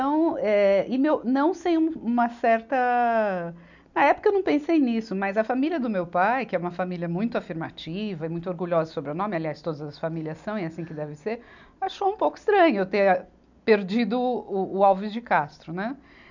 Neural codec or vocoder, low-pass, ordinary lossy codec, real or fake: none; 7.2 kHz; none; real